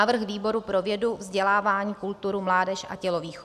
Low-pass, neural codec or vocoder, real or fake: 14.4 kHz; none; real